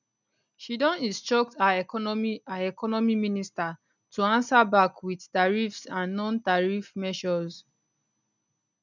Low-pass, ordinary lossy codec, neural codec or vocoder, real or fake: 7.2 kHz; none; none; real